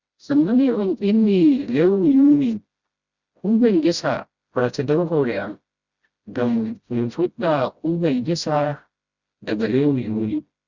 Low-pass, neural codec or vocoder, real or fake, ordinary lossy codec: 7.2 kHz; codec, 16 kHz, 0.5 kbps, FreqCodec, smaller model; fake; Opus, 64 kbps